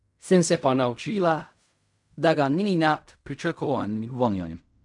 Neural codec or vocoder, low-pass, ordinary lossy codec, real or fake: codec, 16 kHz in and 24 kHz out, 0.4 kbps, LongCat-Audio-Codec, fine tuned four codebook decoder; 10.8 kHz; none; fake